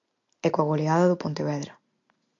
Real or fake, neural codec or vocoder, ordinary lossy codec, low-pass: real; none; AAC, 64 kbps; 7.2 kHz